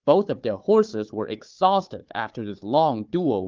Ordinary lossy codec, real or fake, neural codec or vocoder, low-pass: Opus, 24 kbps; fake; codec, 16 kHz, 4 kbps, FunCodec, trained on Chinese and English, 50 frames a second; 7.2 kHz